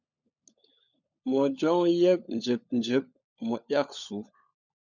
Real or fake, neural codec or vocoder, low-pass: fake; codec, 16 kHz, 16 kbps, FunCodec, trained on LibriTTS, 50 frames a second; 7.2 kHz